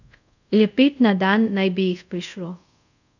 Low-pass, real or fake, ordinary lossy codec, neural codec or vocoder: 7.2 kHz; fake; none; codec, 24 kHz, 0.5 kbps, DualCodec